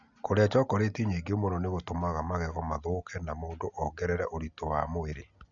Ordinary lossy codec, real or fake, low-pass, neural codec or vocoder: MP3, 96 kbps; real; 7.2 kHz; none